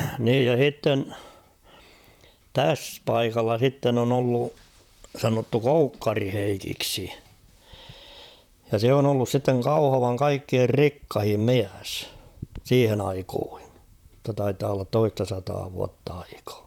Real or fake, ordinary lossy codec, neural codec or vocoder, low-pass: fake; none; vocoder, 44.1 kHz, 128 mel bands, Pupu-Vocoder; 19.8 kHz